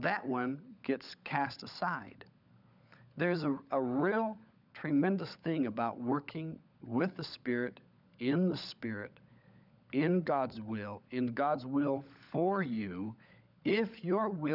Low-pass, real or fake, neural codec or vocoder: 5.4 kHz; fake; codec, 16 kHz, 16 kbps, FunCodec, trained on LibriTTS, 50 frames a second